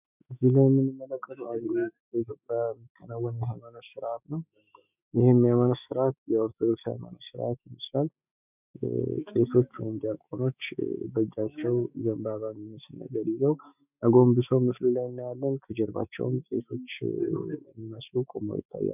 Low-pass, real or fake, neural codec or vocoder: 3.6 kHz; fake; autoencoder, 48 kHz, 128 numbers a frame, DAC-VAE, trained on Japanese speech